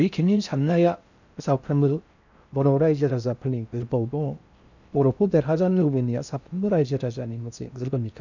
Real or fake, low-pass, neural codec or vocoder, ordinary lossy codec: fake; 7.2 kHz; codec, 16 kHz in and 24 kHz out, 0.6 kbps, FocalCodec, streaming, 4096 codes; none